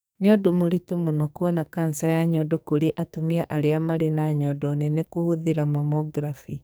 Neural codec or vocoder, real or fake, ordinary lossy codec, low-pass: codec, 44.1 kHz, 2.6 kbps, SNAC; fake; none; none